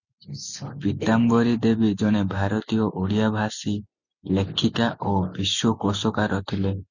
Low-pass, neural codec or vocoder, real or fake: 7.2 kHz; none; real